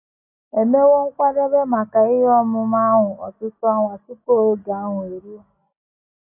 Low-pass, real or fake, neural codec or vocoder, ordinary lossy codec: 3.6 kHz; real; none; AAC, 32 kbps